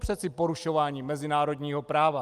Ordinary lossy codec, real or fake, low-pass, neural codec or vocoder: Opus, 32 kbps; real; 14.4 kHz; none